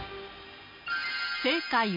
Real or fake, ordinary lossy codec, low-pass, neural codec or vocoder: real; MP3, 32 kbps; 5.4 kHz; none